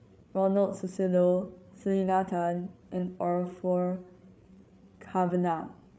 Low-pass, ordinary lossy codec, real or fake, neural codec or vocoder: none; none; fake; codec, 16 kHz, 8 kbps, FreqCodec, larger model